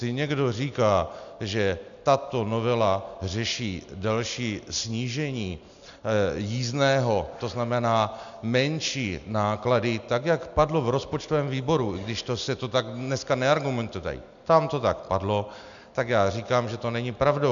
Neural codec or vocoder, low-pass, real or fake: none; 7.2 kHz; real